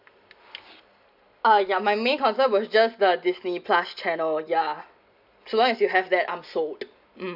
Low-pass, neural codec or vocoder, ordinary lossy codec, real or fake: 5.4 kHz; none; none; real